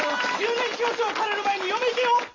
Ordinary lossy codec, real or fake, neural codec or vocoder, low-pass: AAC, 32 kbps; real; none; 7.2 kHz